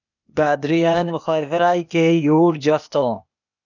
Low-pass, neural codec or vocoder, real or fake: 7.2 kHz; codec, 16 kHz, 0.8 kbps, ZipCodec; fake